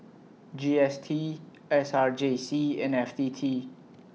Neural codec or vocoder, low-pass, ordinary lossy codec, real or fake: none; none; none; real